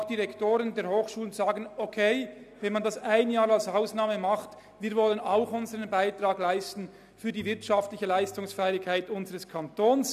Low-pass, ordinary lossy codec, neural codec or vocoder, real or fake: 14.4 kHz; none; none; real